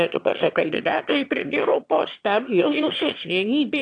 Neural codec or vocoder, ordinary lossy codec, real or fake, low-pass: autoencoder, 22.05 kHz, a latent of 192 numbers a frame, VITS, trained on one speaker; AAC, 48 kbps; fake; 9.9 kHz